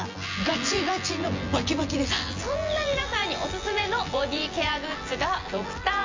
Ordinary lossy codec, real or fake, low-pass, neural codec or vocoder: MP3, 48 kbps; fake; 7.2 kHz; vocoder, 24 kHz, 100 mel bands, Vocos